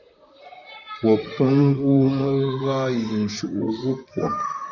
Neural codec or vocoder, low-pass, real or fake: vocoder, 44.1 kHz, 128 mel bands, Pupu-Vocoder; 7.2 kHz; fake